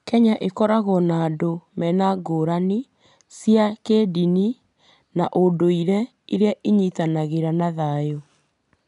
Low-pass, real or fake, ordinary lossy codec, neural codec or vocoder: 10.8 kHz; real; none; none